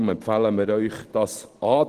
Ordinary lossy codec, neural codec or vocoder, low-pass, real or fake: Opus, 16 kbps; none; 14.4 kHz; real